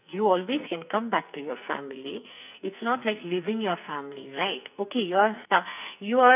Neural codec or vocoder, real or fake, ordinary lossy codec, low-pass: codec, 44.1 kHz, 2.6 kbps, SNAC; fake; none; 3.6 kHz